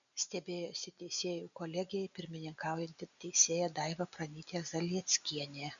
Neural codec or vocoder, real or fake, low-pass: none; real; 7.2 kHz